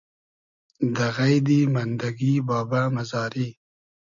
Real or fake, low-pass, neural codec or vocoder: real; 7.2 kHz; none